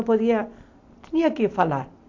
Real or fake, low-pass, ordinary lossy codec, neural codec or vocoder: real; 7.2 kHz; AAC, 48 kbps; none